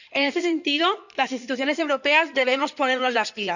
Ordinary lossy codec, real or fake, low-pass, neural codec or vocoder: none; fake; 7.2 kHz; codec, 16 kHz in and 24 kHz out, 2.2 kbps, FireRedTTS-2 codec